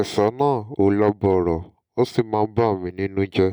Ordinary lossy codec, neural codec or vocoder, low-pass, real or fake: none; none; 19.8 kHz; real